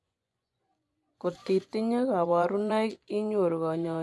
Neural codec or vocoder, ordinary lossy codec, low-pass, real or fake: vocoder, 24 kHz, 100 mel bands, Vocos; none; none; fake